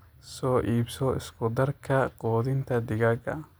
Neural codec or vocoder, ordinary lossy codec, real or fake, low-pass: none; none; real; none